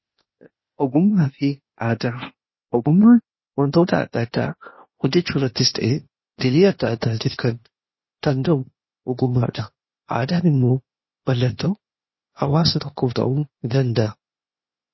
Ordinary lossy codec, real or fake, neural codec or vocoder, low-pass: MP3, 24 kbps; fake; codec, 16 kHz, 0.8 kbps, ZipCodec; 7.2 kHz